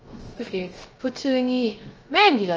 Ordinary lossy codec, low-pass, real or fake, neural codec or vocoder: Opus, 24 kbps; 7.2 kHz; fake; codec, 16 kHz, 0.3 kbps, FocalCodec